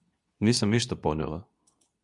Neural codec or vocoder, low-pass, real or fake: codec, 24 kHz, 0.9 kbps, WavTokenizer, medium speech release version 2; 10.8 kHz; fake